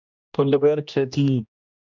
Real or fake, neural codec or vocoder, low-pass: fake; codec, 16 kHz, 1 kbps, X-Codec, HuBERT features, trained on general audio; 7.2 kHz